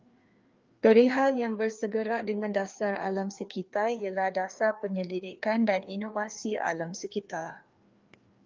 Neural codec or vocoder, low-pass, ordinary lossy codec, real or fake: codec, 16 kHz, 2 kbps, FreqCodec, larger model; 7.2 kHz; Opus, 32 kbps; fake